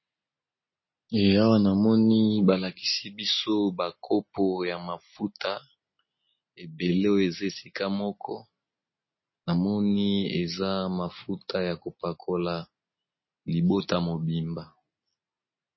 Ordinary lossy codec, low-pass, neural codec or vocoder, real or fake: MP3, 24 kbps; 7.2 kHz; none; real